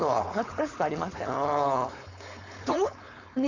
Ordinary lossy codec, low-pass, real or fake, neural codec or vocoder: AAC, 48 kbps; 7.2 kHz; fake; codec, 16 kHz, 4.8 kbps, FACodec